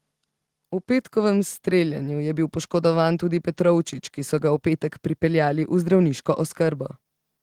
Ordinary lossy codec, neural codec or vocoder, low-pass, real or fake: Opus, 16 kbps; none; 19.8 kHz; real